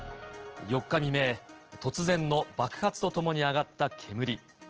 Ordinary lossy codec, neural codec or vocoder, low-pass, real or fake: Opus, 16 kbps; none; 7.2 kHz; real